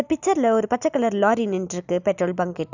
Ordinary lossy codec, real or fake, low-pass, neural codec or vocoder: none; real; 7.2 kHz; none